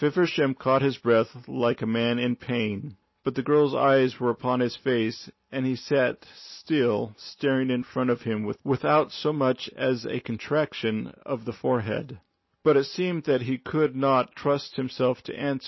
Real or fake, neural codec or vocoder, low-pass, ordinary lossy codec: real; none; 7.2 kHz; MP3, 24 kbps